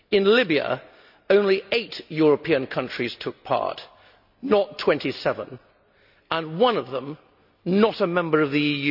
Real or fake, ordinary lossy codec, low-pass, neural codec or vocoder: real; none; 5.4 kHz; none